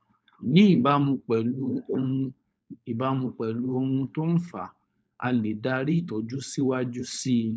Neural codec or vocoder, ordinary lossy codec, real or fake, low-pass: codec, 16 kHz, 4.8 kbps, FACodec; none; fake; none